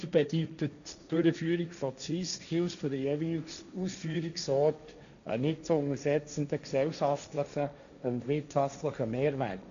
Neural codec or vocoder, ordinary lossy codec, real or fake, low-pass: codec, 16 kHz, 1.1 kbps, Voila-Tokenizer; none; fake; 7.2 kHz